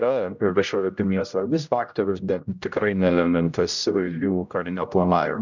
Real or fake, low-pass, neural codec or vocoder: fake; 7.2 kHz; codec, 16 kHz, 0.5 kbps, X-Codec, HuBERT features, trained on general audio